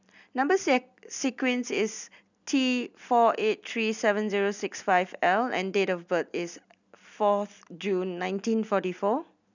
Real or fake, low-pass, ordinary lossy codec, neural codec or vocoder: real; 7.2 kHz; none; none